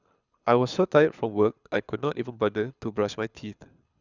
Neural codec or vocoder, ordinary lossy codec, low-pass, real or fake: codec, 24 kHz, 6 kbps, HILCodec; none; 7.2 kHz; fake